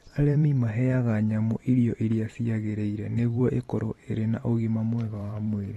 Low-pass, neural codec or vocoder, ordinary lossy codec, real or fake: 19.8 kHz; vocoder, 44.1 kHz, 128 mel bands every 512 samples, BigVGAN v2; AAC, 32 kbps; fake